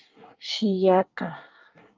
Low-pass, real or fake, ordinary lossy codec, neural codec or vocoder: 7.2 kHz; fake; Opus, 24 kbps; codec, 24 kHz, 1 kbps, SNAC